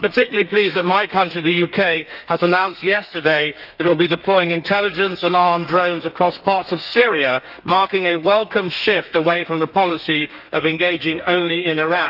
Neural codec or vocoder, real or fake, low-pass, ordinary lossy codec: codec, 32 kHz, 1.9 kbps, SNAC; fake; 5.4 kHz; none